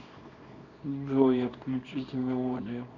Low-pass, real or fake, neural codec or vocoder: 7.2 kHz; fake; codec, 24 kHz, 0.9 kbps, WavTokenizer, small release